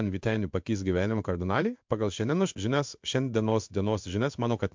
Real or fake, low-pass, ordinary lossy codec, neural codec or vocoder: fake; 7.2 kHz; MP3, 64 kbps; codec, 16 kHz in and 24 kHz out, 1 kbps, XY-Tokenizer